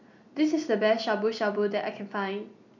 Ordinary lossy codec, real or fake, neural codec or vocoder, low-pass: none; real; none; 7.2 kHz